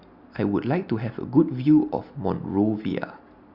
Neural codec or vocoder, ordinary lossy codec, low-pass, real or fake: none; Opus, 64 kbps; 5.4 kHz; real